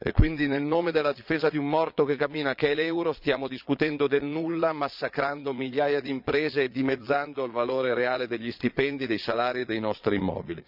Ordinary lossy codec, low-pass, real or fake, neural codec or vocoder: none; 5.4 kHz; fake; vocoder, 22.05 kHz, 80 mel bands, Vocos